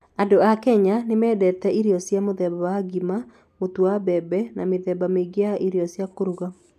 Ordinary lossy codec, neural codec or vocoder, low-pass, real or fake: none; none; 14.4 kHz; real